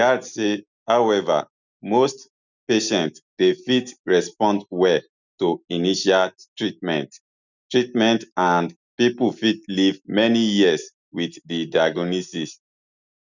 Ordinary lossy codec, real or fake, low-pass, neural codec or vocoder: none; real; 7.2 kHz; none